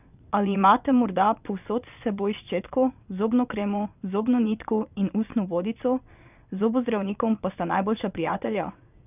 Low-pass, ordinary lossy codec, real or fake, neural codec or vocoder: 3.6 kHz; none; fake; vocoder, 22.05 kHz, 80 mel bands, WaveNeXt